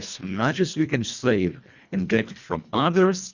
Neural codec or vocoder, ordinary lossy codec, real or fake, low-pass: codec, 24 kHz, 1.5 kbps, HILCodec; Opus, 64 kbps; fake; 7.2 kHz